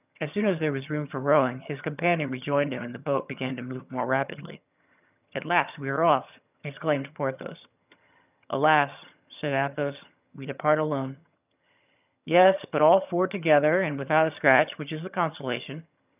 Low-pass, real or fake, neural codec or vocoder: 3.6 kHz; fake; vocoder, 22.05 kHz, 80 mel bands, HiFi-GAN